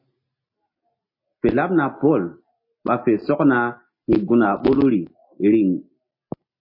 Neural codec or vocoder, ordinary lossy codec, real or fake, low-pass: none; MP3, 24 kbps; real; 5.4 kHz